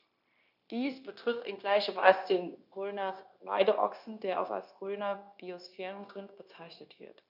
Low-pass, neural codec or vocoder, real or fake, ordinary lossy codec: 5.4 kHz; codec, 16 kHz, 0.9 kbps, LongCat-Audio-Codec; fake; none